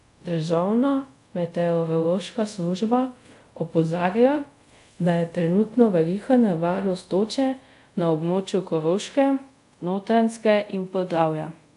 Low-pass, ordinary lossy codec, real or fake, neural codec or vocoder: 10.8 kHz; none; fake; codec, 24 kHz, 0.5 kbps, DualCodec